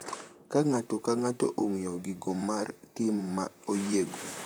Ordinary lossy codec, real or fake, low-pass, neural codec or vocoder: none; fake; none; vocoder, 44.1 kHz, 128 mel bands, Pupu-Vocoder